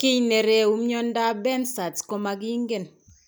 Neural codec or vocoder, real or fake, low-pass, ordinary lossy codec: none; real; none; none